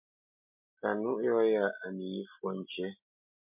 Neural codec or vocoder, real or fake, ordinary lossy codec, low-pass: none; real; MP3, 32 kbps; 3.6 kHz